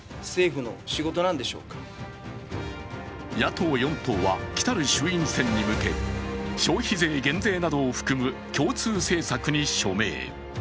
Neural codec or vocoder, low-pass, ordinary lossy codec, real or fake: none; none; none; real